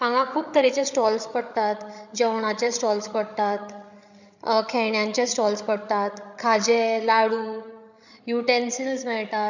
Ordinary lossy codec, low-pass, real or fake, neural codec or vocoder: none; 7.2 kHz; fake; codec, 16 kHz, 8 kbps, FreqCodec, larger model